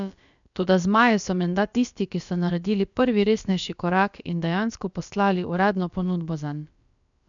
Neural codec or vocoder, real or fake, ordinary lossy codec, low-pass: codec, 16 kHz, about 1 kbps, DyCAST, with the encoder's durations; fake; none; 7.2 kHz